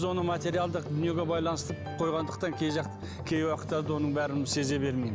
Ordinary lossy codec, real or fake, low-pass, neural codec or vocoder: none; real; none; none